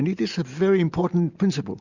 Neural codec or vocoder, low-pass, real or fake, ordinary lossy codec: none; 7.2 kHz; real; Opus, 64 kbps